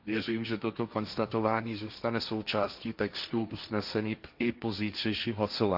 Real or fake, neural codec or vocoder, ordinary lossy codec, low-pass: fake; codec, 16 kHz, 1.1 kbps, Voila-Tokenizer; none; 5.4 kHz